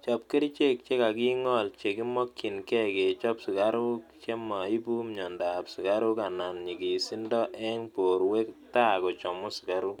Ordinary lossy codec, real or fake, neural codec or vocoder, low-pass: none; real; none; 19.8 kHz